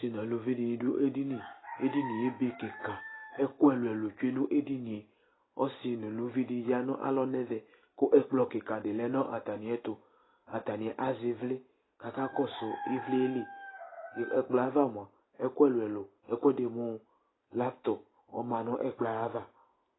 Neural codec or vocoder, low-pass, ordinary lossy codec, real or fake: none; 7.2 kHz; AAC, 16 kbps; real